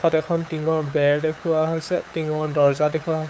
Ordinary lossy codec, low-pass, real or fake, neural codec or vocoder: none; none; fake; codec, 16 kHz, 8 kbps, FunCodec, trained on LibriTTS, 25 frames a second